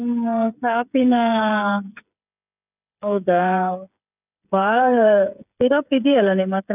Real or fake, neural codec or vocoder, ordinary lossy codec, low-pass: fake; codec, 16 kHz, 8 kbps, FreqCodec, smaller model; none; 3.6 kHz